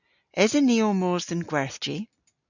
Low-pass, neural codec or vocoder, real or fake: 7.2 kHz; none; real